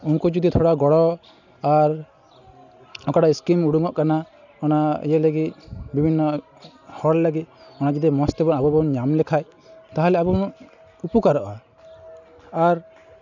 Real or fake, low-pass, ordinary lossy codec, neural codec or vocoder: real; 7.2 kHz; none; none